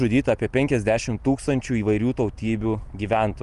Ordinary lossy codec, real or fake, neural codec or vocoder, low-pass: Opus, 32 kbps; real; none; 10.8 kHz